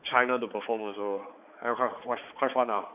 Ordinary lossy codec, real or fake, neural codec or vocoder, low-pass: none; fake; codec, 16 kHz, 4 kbps, X-Codec, HuBERT features, trained on balanced general audio; 3.6 kHz